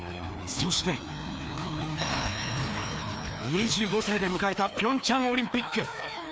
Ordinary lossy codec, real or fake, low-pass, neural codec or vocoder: none; fake; none; codec, 16 kHz, 2 kbps, FreqCodec, larger model